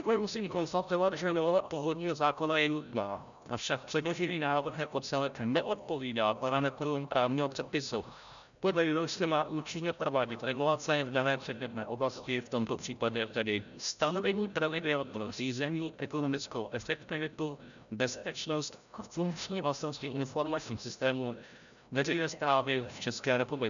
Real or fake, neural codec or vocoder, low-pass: fake; codec, 16 kHz, 0.5 kbps, FreqCodec, larger model; 7.2 kHz